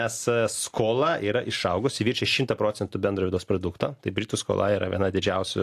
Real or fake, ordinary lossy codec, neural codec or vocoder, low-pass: real; AAC, 64 kbps; none; 14.4 kHz